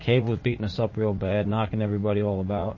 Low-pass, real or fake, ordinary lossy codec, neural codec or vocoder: 7.2 kHz; fake; MP3, 32 kbps; vocoder, 44.1 kHz, 80 mel bands, Vocos